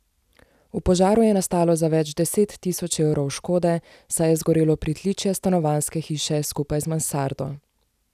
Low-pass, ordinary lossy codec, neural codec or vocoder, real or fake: 14.4 kHz; none; none; real